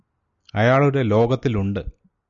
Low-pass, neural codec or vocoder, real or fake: 7.2 kHz; none; real